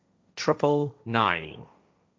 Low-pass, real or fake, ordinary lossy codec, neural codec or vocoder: none; fake; none; codec, 16 kHz, 1.1 kbps, Voila-Tokenizer